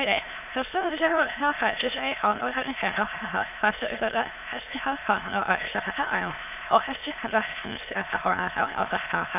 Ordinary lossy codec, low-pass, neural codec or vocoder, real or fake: none; 3.6 kHz; autoencoder, 22.05 kHz, a latent of 192 numbers a frame, VITS, trained on many speakers; fake